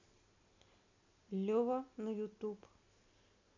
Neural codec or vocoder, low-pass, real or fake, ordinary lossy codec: none; 7.2 kHz; real; MP3, 64 kbps